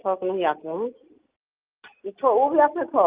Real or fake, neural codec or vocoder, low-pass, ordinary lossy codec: real; none; 3.6 kHz; Opus, 32 kbps